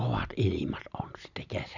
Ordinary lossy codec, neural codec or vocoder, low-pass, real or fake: none; none; 7.2 kHz; real